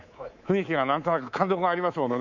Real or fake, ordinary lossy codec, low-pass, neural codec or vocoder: fake; none; 7.2 kHz; codec, 24 kHz, 3.1 kbps, DualCodec